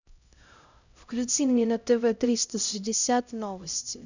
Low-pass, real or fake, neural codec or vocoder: 7.2 kHz; fake; codec, 16 kHz, 0.5 kbps, X-Codec, HuBERT features, trained on LibriSpeech